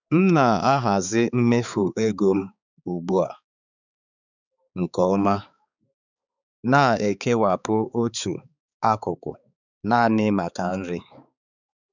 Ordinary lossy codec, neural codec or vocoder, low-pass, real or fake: none; codec, 16 kHz, 4 kbps, X-Codec, HuBERT features, trained on balanced general audio; 7.2 kHz; fake